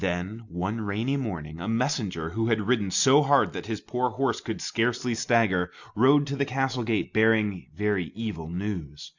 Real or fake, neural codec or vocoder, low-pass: real; none; 7.2 kHz